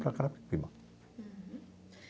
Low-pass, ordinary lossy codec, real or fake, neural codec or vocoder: none; none; real; none